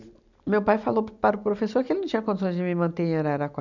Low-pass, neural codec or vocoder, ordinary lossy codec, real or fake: 7.2 kHz; none; none; real